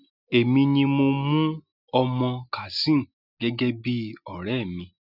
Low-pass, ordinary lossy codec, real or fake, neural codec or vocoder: 5.4 kHz; none; real; none